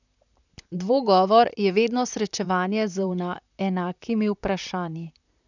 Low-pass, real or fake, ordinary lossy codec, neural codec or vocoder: 7.2 kHz; fake; none; vocoder, 44.1 kHz, 128 mel bands, Pupu-Vocoder